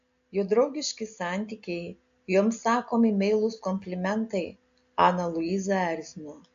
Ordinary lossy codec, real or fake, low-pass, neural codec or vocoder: AAC, 64 kbps; real; 7.2 kHz; none